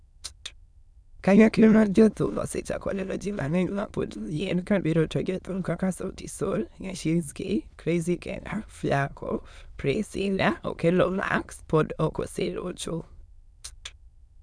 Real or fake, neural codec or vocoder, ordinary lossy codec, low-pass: fake; autoencoder, 22.05 kHz, a latent of 192 numbers a frame, VITS, trained on many speakers; none; none